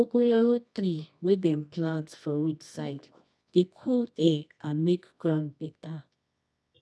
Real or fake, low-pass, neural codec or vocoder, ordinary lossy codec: fake; none; codec, 24 kHz, 0.9 kbps, WavTokenizer, medium music audio release; none